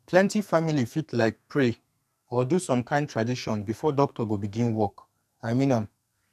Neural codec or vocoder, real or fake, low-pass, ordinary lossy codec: codec, 44.1 kHz, 2.6 kbps, SNAC; fake; 14.4 kHz; none